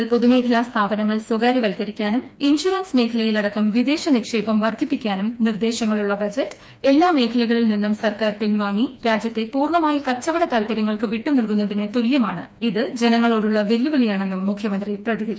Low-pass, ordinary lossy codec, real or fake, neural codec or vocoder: none; none; fake; codec, 16 kHz, 2 kbps, FreqCodec, smaller model